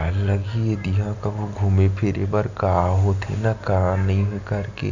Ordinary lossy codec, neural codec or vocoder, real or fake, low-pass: Opus, 64 kbps; none; real; 7.2 kHz